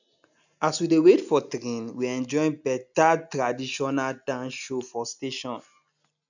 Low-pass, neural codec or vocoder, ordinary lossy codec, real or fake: 7.2 kHz; none; none; real